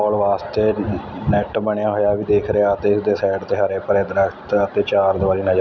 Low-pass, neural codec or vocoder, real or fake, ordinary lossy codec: 7.2 kHz; none; real; none